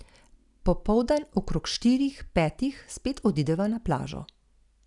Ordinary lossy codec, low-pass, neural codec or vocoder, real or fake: none; 10.8 kHz; none; real